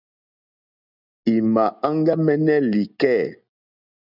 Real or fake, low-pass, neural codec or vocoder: real; 5.4 kHz; none